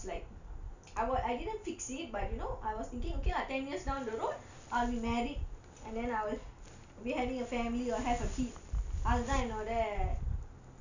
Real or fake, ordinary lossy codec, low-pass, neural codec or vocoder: real; none; 7.2 kHz; none